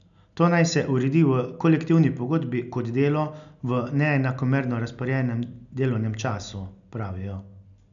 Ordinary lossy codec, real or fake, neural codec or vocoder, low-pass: none; real; none; 7.2 kHz